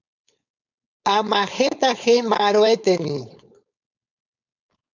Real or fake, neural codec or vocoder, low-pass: fake; codec, 16 kHz, 4.8 kbps, FACodec; 7.2 kHz